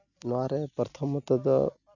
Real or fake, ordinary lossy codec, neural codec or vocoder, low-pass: real; none; none; 7.2 kHz